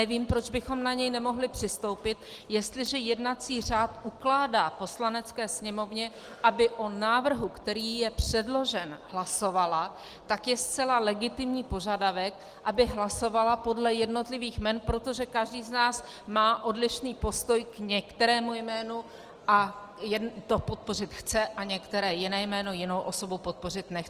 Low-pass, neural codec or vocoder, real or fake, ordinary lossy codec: 14.4 kHz; none; real; Opus, 24 kbps